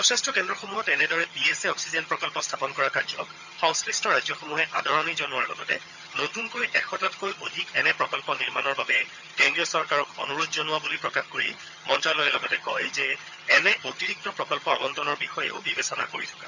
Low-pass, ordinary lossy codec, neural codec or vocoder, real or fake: 7.2 kHz; none; vocoder, 22.05 kHz, 80 mel bands, HiFi-GAN; fake